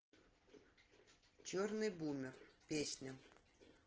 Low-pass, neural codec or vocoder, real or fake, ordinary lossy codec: 7.2 kHz; none; real; Opus, 16 kbps